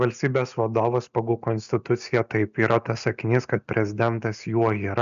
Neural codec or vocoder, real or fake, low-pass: none; real; 7.2 kHz